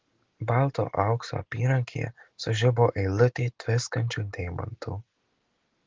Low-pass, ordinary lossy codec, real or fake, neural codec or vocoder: 7.2 kHz; Opus, 16 kbps; real; none